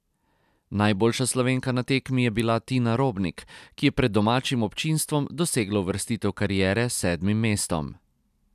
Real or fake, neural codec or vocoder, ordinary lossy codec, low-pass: real; none; none; 14.4 kHz